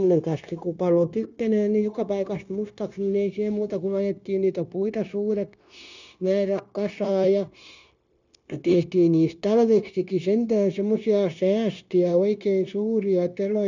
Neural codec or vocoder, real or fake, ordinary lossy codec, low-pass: codec, 16 kHz, 0.9 kbps, LongCat-Audio-Codec; fake; none; 7.2 kHz